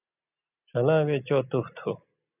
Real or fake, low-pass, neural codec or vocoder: real; 3.6 kHz; none